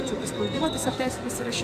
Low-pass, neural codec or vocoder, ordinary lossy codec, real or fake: 14.4 kHz; codec, 44.1 kHz, 2.6 kbps, SNAC; MP3, 96 kbps; fake